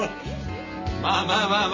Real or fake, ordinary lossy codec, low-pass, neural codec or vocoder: real; MP3, 32 kbps; 7.2 kHz; none